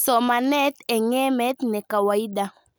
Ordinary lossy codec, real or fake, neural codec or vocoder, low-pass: none; real; none; none